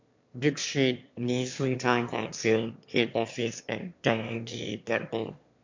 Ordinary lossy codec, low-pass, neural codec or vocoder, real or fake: MP3, 48 kbps; 7.2 kHz; autoencoder, 22.05 kHz, a latent of 192 numbers a frame, VITS, trained on one speaker; fake